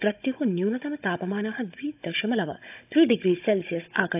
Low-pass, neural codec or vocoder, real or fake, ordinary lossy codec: 3.6 kHz; codec, 16 kHz, 16 kbps, FunCodec, trained on Chinese and English, 50 frames a second; fake; AAC, 32 kbps